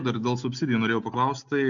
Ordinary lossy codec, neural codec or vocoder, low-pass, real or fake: MP3, 64 kbps; none; 7.2 kHz; real